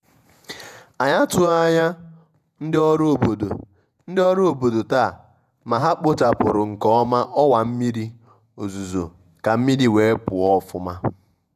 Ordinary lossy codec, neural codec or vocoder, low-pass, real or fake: none; vocoder, 48 kHz, 128 mel bands, Vocos; 14.4 kHz; fake